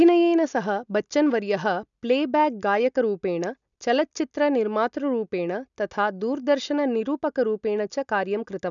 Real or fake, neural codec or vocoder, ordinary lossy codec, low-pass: real; none; none; 7.2 kHz